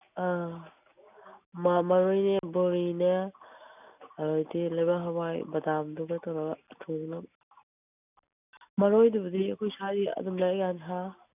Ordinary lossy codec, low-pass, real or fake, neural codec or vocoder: none; 3.6 kHz; real; none